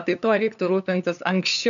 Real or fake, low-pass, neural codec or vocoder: fake; 7.2 kHz; codec, 16 kHz, 2 kbps, FunCodec, trained on LibriTTS, 25 frames a second